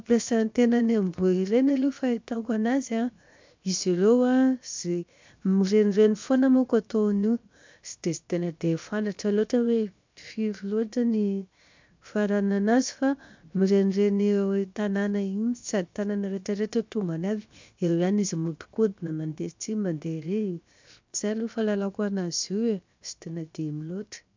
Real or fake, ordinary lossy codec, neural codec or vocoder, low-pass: fake; MP3, 64 kbps; codec, 16 kHz, 0.7 kbps, FocalCodec; 7.2 kHz